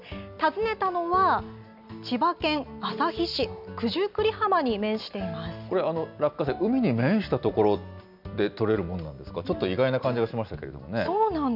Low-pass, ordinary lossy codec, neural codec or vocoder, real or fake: 5.4 kHz; none; none; real